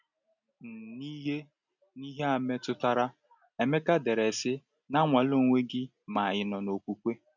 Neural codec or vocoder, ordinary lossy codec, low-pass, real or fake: none; none; 7.2 kHz; real